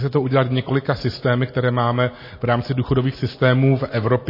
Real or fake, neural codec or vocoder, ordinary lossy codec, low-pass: real; none; MP3, 24 kbps; 5.4 kHz